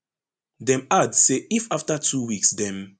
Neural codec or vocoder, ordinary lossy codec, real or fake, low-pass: none; none; real; 9.9 kHz